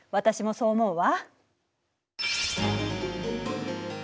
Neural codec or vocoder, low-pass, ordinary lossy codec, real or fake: none; none; none; real